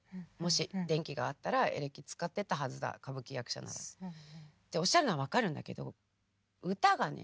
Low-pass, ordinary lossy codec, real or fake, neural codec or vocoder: none; none; real; none